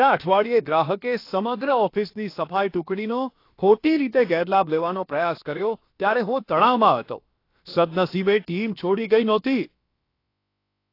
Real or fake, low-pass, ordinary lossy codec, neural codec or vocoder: fake; 5.4 kHz; AAC, 32 kbps; codec, 16 kHz, about 1 kbps, DyCAST, with the encoder's durations